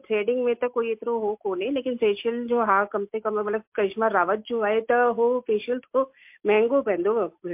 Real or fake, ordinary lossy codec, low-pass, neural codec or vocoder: real; MP3, 32 kbps; 3.6 kHz; none